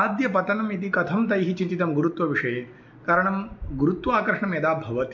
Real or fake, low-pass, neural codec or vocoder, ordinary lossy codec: real; 7.2 kHz; none; MP3, 48 kbps